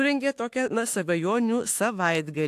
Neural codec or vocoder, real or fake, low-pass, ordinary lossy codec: autoencoder, 48 kHz, 32 numbers a frame, DAC-VAE, trained on Japanese speech; fake; 14.4 kHz; AAC, 64 kbps